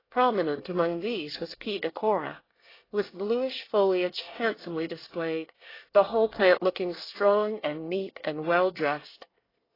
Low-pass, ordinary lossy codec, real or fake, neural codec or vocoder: 5.4 kHz; AAC, 24 kbps; fake; codec, 24 kHz, 1 kbps, SNAC